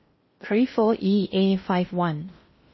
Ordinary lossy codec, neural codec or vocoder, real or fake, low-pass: MP3, 24 kbps; codec, 16 kHz in and 24 kHz out, 0.6 kbps, FocalCodec, streaming, 4096 codes; fake; 7.2 kHz